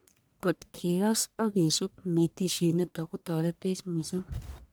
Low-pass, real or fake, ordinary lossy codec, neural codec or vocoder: none; fake; none; codec, 44.1 kHz, 1.7 kbps, Pupu-Codec